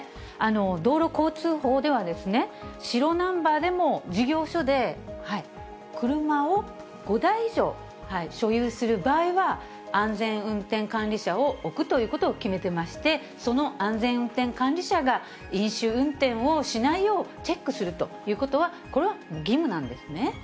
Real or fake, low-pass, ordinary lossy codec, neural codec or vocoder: real; none; none; none